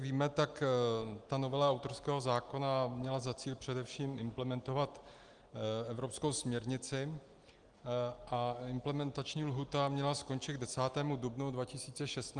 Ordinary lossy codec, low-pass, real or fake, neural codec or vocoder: Opus, 24 kbps; 9.9 kHz; real; none